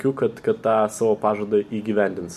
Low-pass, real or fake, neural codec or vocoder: 14.4 kHz; real; none